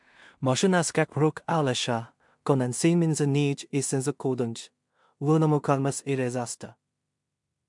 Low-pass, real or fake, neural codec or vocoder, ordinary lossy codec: 10.8 kHz; fake; codec, 16 kHz in and 24 kHz out, 0.4 kbps, LongCat-Audio-Codec, two codebook decoder; MP3, 64 kbps